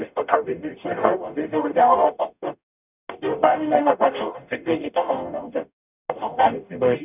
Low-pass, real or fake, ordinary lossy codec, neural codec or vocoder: 3.6 kHz; fake; none; codec, 44.1 kHz, 0.9 kbps, DAC